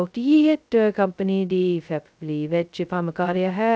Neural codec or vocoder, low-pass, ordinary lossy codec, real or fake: codec, 16 kHz, 0.2 kbps, FocalCodec; none; none; fake